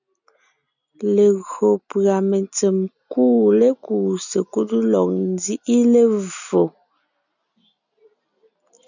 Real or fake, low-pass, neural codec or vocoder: real; 7.2 kHz; none